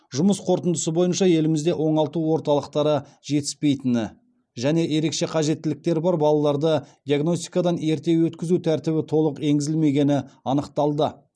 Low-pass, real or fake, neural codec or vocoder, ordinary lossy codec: none; real; none; none